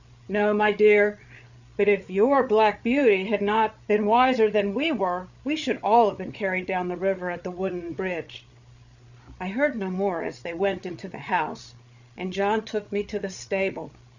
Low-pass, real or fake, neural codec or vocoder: 7.2 kHz; fake; codec, 16 kHz, 8 kbps, FreqCodec, larger model